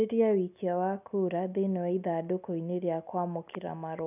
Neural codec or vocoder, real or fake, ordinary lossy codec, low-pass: none; real; none; 3.6 kHz